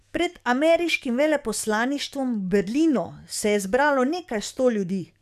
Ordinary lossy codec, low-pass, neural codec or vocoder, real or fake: none; 14.4 kHz; codec, 44.1 kHz, 7.8 kbps, DAC; fake